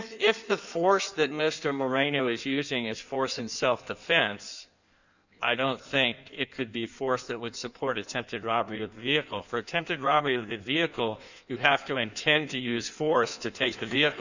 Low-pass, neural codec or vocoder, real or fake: 7.2 kHz; codec, 16 kHz in and 24 kHz out, 1.1 kbps, FireRedTTS-2 codec; fake